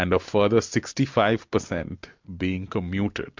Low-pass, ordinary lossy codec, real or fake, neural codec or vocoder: 7.2 kHz; MP3, 64 kbps; real; none